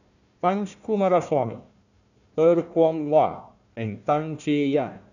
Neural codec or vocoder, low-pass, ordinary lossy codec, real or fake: codec, 16 kHz, 1 kbps, FunCodec, trained on Chinese and English, 50 frames a second; 7.2 kHz; none; fake